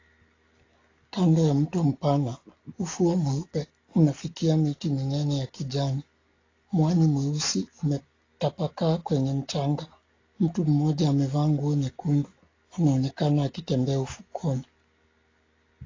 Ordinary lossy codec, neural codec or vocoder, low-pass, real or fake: AAC, 32 kbps; none; 7.2 kHz; real